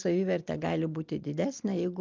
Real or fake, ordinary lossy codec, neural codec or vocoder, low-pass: real; Opus, 24 kbps; none; 7.2 kHz